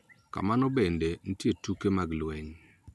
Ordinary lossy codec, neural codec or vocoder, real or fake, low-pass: none; none; real; none